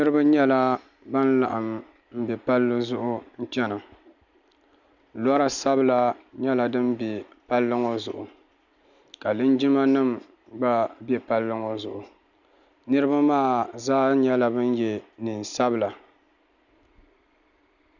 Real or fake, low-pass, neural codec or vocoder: real; 7.2 kHz; none